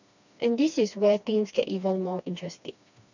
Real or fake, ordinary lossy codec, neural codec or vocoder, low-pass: fake; none; codec, 16 kHz, 2 kbps, FreqCodec, smaller model; 7.2 kHz